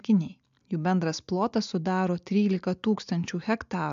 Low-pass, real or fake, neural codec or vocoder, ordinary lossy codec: 7.2 kHz; real; none; MP3, 64 kbps